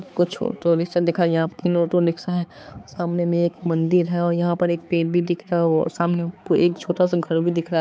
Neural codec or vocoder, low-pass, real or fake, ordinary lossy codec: codec, 16 kHz, 4 kbps, X-Codec, HuBERT features, trained on balanced general audio; none; fake; none